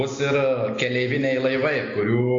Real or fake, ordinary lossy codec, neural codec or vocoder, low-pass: real; AAC, 48 kbps; none; 7.2 kHz